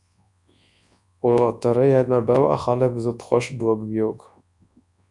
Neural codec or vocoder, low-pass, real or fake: codec, 24 kHz, 0.9 kbps, WavTokenizer, large speech release; 10.8 kHz; fake